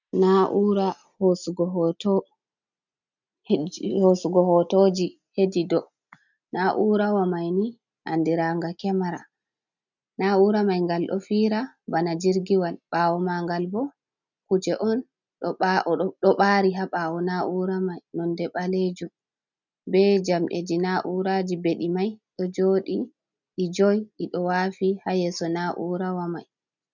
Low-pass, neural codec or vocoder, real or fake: 7.2 kHz; none; real